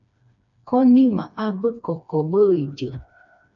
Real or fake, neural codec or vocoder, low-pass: fake; codec, 16 kHz, 2 kbps, FreqCodec, smaller model; 7.2 kHz